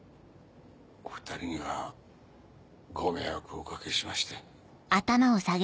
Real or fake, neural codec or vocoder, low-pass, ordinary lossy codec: real; none; none; none